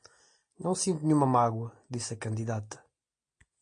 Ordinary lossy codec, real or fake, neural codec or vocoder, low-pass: MP3, 48 kbps; real; none; 9.9 kHz